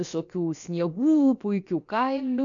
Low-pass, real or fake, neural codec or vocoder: 7.2 kHz; fake; codec, 16 kHz, about 1 kbps, DyCAST, with the encoder's durations